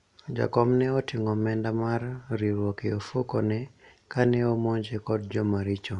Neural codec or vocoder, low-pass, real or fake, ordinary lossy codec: none; 10.8 kHz; real; none